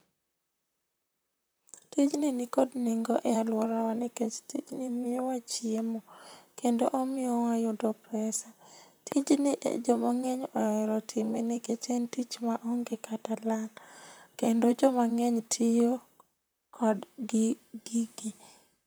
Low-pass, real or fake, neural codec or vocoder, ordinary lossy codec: none; fake; vocoder, 44.1 kHz, 128 mel bands, Pupu-Vocoder; none